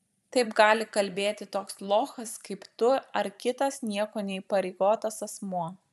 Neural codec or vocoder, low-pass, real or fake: vocoder, 44.1 kHz, 128 mel bands every 512 samples, BigVGAN v2; 14.4 kHz; fake